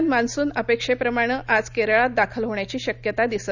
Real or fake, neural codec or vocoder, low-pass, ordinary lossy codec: real; none; 7.2 kHz; none